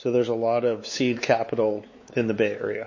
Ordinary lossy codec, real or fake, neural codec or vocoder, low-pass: MP3, 32 kbps; fake; codec, 16 kHz, 4 kbps, X-Codec, WavLM features, trained on Multilingual LibriSpeech; 7.2 kHz